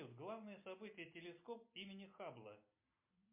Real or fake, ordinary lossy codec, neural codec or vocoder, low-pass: real; MP3, 32 kbps; none; 3.6 kHz